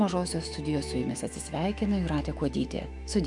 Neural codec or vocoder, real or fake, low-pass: autoencoder, 48 kHz, 128 numbers a frame, DAC-VAE, trained on Japanese speech; fake; 10.8 kHz